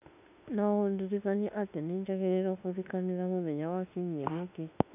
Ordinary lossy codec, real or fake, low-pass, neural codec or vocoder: none; fake; 3.6 kHz; autoencoder, 48 kHz, 32 numbers a frame, DAC-VAE, trained on Japanese speech